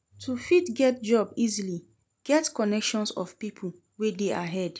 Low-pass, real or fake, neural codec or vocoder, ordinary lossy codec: none; real; none; none